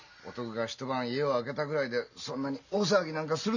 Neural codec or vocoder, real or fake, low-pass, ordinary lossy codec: none; real; 7.2 kHz; none